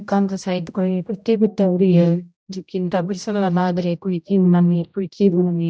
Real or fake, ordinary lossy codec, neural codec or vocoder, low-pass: fake; none; codec, 16 kHz, 0.5 kbps, X-Codec, HuBERT features, trained on general audio; none